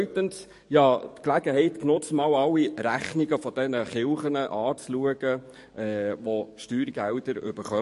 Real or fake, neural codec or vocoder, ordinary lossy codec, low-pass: fake; codec, 44.1 kHz, 7.8 kbps, DAC; MP3, 48 kbps; 14.4 kHz